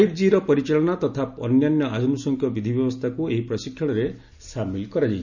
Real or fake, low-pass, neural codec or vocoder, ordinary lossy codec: real; 7.2 kHz; none; none